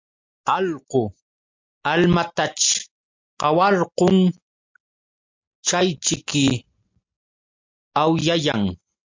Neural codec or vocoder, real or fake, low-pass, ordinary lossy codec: none; real; 7.2 kHz; MP3, 64 kbps